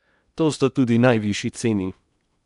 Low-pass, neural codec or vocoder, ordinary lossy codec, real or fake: 10.8 kHz; codec, 16 kHz in and 24 kHz out, 0.8 kbps, FocalCodec, streaming, 65536 codes; none; fake